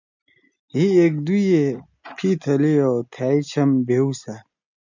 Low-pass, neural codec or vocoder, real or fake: 7.2 kHz; none; real